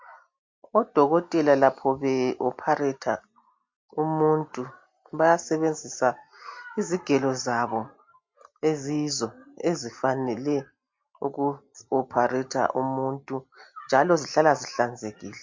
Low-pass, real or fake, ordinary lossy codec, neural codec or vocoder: 7.2 kHz; real; MP3, 48 kbps; none